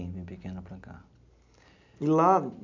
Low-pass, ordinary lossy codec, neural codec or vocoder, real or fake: 7.2 kHz; MP3, 64 kbps; none; real